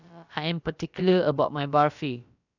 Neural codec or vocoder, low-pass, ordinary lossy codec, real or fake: codec, 16 kHz, about 1 kbps, DyCAST, with the encoder's durations; 7.2 kHz; none; fake